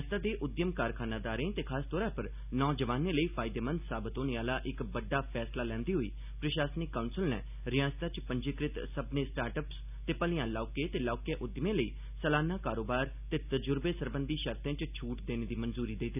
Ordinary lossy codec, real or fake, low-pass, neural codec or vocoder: none; real; 3.6 kHz; none